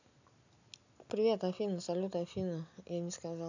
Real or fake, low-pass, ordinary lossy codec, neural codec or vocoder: real; 7.2 kHz; none; none